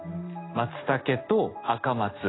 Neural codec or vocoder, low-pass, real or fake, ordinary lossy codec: none; 7.2 kHz; real; AAC, 16 kbps